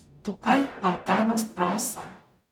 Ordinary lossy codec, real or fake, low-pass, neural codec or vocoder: none; fake; 19.8 kHz; codec, 44.1 kHz, 0.9 kbps, DAC